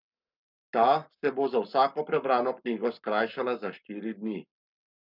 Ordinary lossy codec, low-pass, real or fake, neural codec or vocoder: none; 5.4 kHz; fake; codec, 44.1 kHz, 7.8 kbps, Pupu-Codec